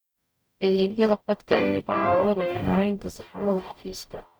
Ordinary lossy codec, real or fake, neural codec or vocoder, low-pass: none; fake; codec, 44.1 kHz, 0.9 kbps, DAC; none